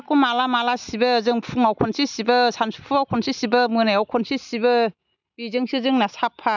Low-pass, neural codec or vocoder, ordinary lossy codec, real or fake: 7.2 kHz; none; none; real